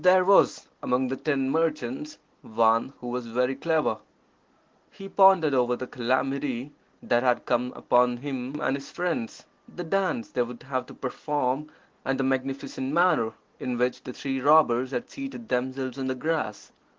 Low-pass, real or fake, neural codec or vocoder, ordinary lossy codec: 7.2 kHz; real; none; Opus, 16 kbps